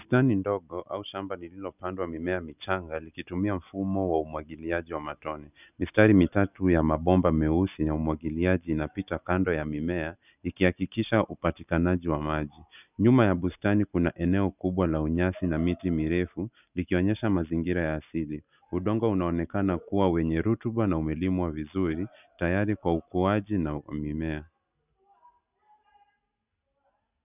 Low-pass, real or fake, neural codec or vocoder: 3.6 kHz; real; none